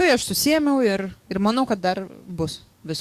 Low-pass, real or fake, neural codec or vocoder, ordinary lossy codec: 14.4 kHz; fake; codec, 44.1 kHz, 7.8 kbps, DAC; Opus, 64 kbps